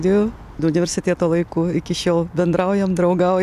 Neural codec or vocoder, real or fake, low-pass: none; real; 14.4 kHz